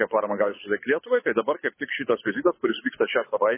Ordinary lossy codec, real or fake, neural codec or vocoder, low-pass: MP3, 16 kbps; fake; codec, 24 kHz, 6 kbps, HILCodec; 3.6 kHz